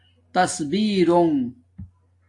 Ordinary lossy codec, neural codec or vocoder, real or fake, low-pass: AAC, 48 kbps; none; real; 10.8 kHz